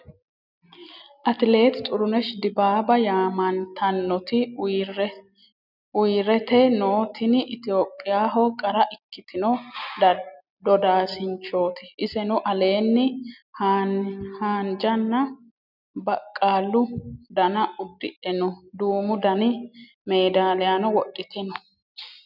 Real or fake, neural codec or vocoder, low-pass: real; none; 5.4 kHz